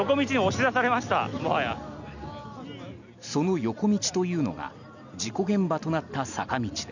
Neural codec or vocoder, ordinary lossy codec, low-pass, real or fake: none; none; 7.2 kHz; real